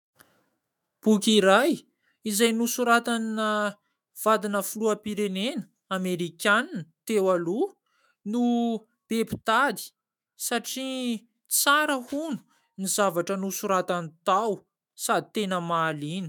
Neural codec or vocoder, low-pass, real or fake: autoencoder, 48 kHz, 128 numbers a frame, DAC-VAE, trained on Japanese speech; 19.8 kHz; fake